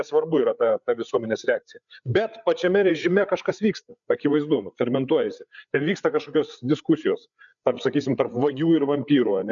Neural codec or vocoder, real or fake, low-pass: codec, 16 kHz, 8 kbps, FreqCodec, larger model; fake; 7.2 kHz